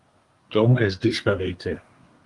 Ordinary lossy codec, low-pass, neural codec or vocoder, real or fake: Opus, 32 kbps; 10.8 kHz; codec, 24 kHz, 1 kbps, SNAC; fake